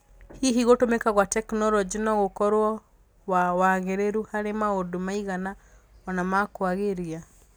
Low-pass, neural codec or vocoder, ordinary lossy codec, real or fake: none; none; none; real